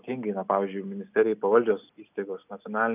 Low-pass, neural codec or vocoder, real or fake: 3.6 kHz; none; real